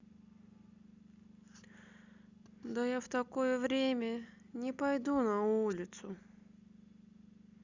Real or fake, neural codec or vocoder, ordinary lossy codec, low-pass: real; none; Opus, 64 kbps; 7.2 kHz